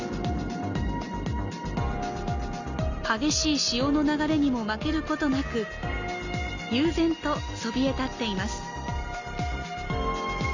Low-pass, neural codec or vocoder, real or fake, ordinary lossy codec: 7.2 kHz; none; real; Opus, 64 kbps